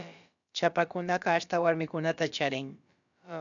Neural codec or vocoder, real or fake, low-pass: codec, 16 kHz, about 1 kbps, DyCAST, with the encoder's durations; fake; 7.2 kHz